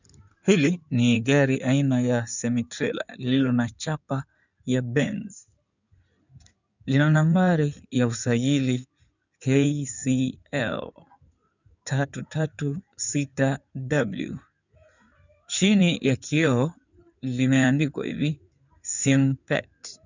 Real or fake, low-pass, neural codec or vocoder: fake; 7.2 kHz; codec, 16 kHz in and 24 kHz out, 2.2 kbps, FireRedTTS-2 codec